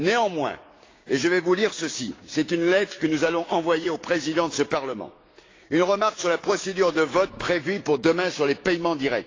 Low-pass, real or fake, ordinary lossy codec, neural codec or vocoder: 7.2 kHz; fake; AAC, 32 kbps; codec, 16 kHz, 6 kbps, DAC